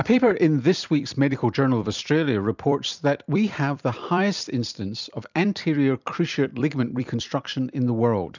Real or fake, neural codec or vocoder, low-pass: fake; vocoder, 44.1 kHz, 128 mel bands every 256 samples, BigVGAN v2; 7.2 kHz